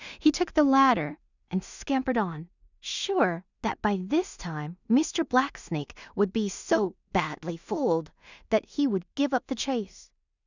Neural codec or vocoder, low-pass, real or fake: codec, 16 kHz in and 24 kHz out, 0.4 kbps, LongCat-Audio-Codec, two codebook decoder; 7.2 kHz; fake